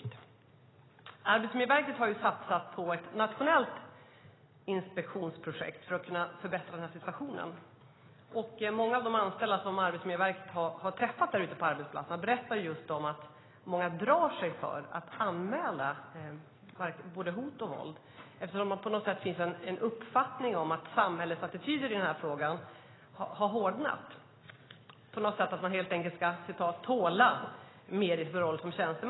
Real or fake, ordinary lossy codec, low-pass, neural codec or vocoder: real; AAC, 16 kbps; 7.2 kHz; none